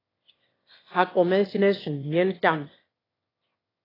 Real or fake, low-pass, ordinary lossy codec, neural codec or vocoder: fake; 5.4 kHz; AAC, 24 kbps; autoencoder, 22.05 kHz, a latent of 192 numbers a frame, VITS, trained on one speaker